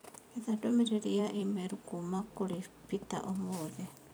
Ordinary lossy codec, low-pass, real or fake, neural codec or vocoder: none; none; fake; vocoder, 44.1 kHz, 128 mel bands every 256 samples, BigVGAN v2